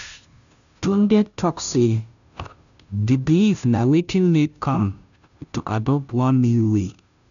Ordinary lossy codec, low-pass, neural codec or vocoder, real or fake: none; 7.2 kHz; codec, 16 kHz, 0.5 kbps, FunCodec, trained on Chinese and English, 25 frames a second; fake